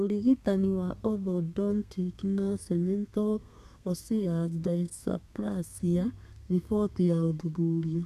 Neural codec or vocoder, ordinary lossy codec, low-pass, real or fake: codec, 32 kHz, 1.9 kbps, SNAC; none; 14.4 kHz; fake